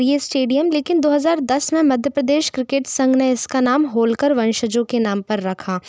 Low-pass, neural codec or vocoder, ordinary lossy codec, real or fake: none; none; none; real